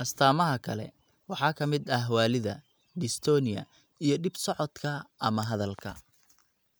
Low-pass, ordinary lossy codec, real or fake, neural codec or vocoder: none; none; real; none